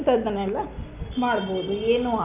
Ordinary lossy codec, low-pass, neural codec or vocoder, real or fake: AAC, 32 kbps; 3.6 kHz; none; real